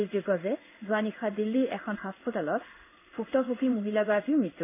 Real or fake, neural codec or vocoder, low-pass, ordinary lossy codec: fake; codec, 16 kHz in and 24 kHz out, 1 kbps, XY-Tokenizer; 3.6 kHz; none